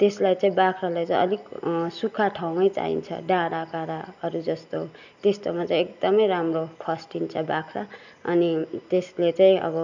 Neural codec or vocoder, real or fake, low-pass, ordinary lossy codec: none; real; 7.2 kHz; none